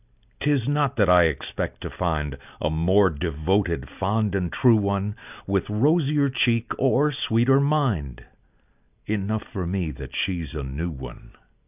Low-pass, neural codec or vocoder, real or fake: 3.6 kHz; none; real